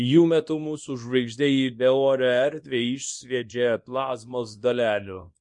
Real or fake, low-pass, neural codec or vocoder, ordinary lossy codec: fake; 10.8 kHz; codec, 24 kHz, 0.9 kbps, WavTokenizer, small release; MP3, 48 kbps